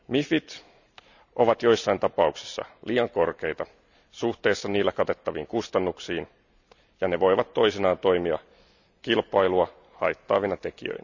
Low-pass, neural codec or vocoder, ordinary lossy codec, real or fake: 7.2 kHz; none; none; real